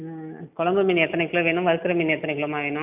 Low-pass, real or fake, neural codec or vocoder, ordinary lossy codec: 3.6 kHz; real; none; none